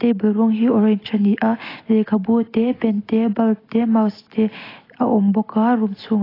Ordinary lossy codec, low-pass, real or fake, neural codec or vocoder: AAC, 24 kbps; 5.4 kHz; real; none